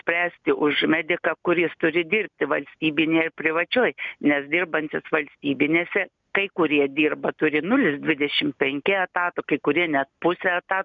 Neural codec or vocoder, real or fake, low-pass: none; real; 7.2 kHz